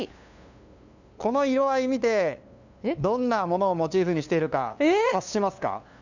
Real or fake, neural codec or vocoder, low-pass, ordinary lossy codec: fake; codec, 16 kHz, 2 kbps, FunCodec, trained on LibriTTS, 25 frames a second; 7.2 kHz; none